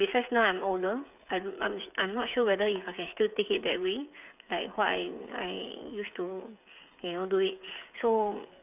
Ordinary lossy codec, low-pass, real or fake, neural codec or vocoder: none; 3.6 kHz; fake; codec, 16 kHz, 8 kbps, FreqCodec, smaller model